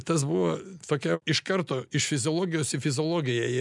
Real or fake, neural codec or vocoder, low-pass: real; none; 10.8 kHz